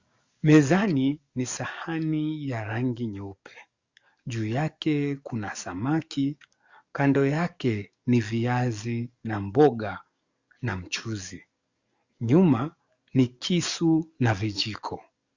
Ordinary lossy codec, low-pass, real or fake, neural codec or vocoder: Opus, 64 kbps; 7.2 kHz; fake; codec, 44.1 kHz, 7.8 kbps, DAC